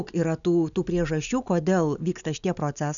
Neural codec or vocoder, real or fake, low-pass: none; real; 7.2 kHz